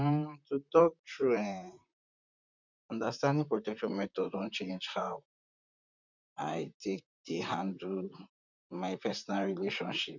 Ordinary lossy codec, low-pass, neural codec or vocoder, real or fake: none; 7.2 kHz; vocoder, 44.1 kHz, 128 mel bands, Pupu-Vocoder; fake